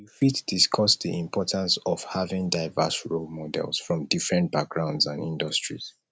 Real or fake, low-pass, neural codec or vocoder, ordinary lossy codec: real; none; none; none